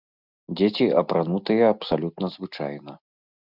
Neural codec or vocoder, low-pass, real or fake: none; 5.4 kHz; real